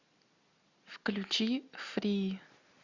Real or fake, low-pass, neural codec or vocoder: real; 7.2 kHz; none